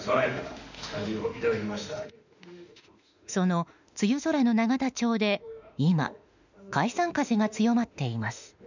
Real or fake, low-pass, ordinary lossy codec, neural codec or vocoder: fake; 7.2 kHz; none; autoencoder, 48 kHz, 32 numbers a frame, DAC-VAE, trained on Japanese speech